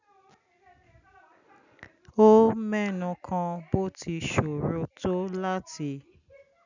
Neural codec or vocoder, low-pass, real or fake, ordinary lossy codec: none; 7.2 kHz; real; none